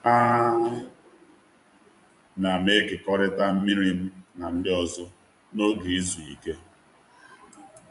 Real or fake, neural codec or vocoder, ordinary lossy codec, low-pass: fake; vocoder, 24 kHz, 100 mel bands, Vocos; none; 10.8 kHz